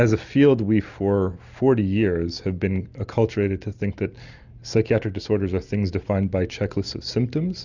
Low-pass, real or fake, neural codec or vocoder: 7.2 kHz; real; none